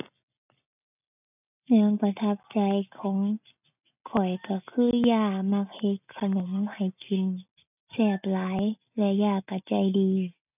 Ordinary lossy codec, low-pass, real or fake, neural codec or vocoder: none; 3.6 kHz; real; none